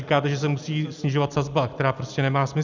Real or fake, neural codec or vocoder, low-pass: real; none; 7.2 kHz